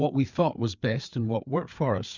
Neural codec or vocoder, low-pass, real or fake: codec, 16 kHz, 4 kbps, FreqCodec, larger model; 7.2 kHz; fake